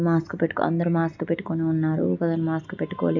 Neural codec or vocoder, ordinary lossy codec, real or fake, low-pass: none; none; real; 7.2 kHz